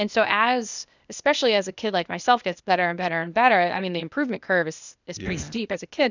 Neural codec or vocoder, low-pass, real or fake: codec, 16 kHz, 0.8 kbps, ZipCodec; 7.2 kHz; fake